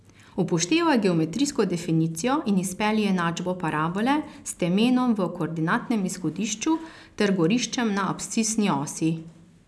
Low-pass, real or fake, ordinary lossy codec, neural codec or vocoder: none; real; none; none